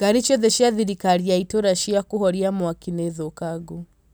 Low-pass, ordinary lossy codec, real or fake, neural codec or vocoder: none; none; real; none